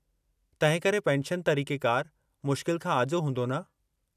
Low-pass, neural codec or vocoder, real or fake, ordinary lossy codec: 14.4 kHz; none; real; none